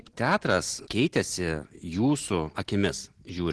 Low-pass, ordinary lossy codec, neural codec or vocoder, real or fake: 10.8 kHz; Opus, 16 kbps; none; real